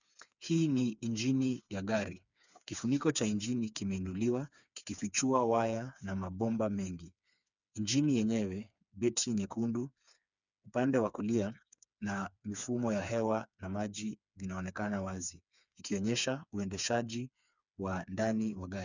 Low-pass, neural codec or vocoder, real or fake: 7.2 kHz; codec, 16 kHz, 4 kbps, FreqCodec, smaller model; fake